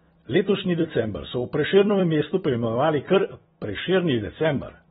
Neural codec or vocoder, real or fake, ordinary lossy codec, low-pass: vocoder, 44.1 kHz, 128 mel bands every 256 samples, BigVGAN v2; fake; AAC, 16 kbps; 19.8 kHz